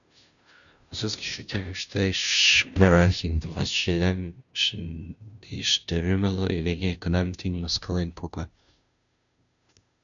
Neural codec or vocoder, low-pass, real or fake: codec, 16 kHz, 0.5 kbps, FunCodec, trained on Chinese and English, 25 frames a second; 7.2 kHz; fake